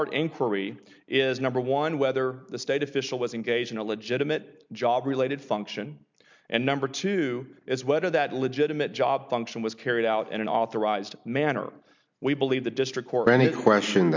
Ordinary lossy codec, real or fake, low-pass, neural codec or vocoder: MP3, 64 kbps; real; 7.2 kHz; none